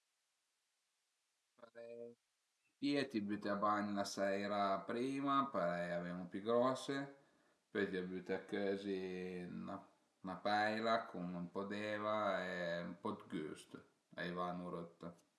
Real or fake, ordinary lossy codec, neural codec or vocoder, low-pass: real; none; none; none